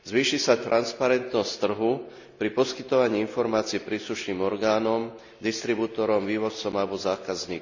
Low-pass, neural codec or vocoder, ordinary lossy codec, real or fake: 7.2 kHz; none; none; real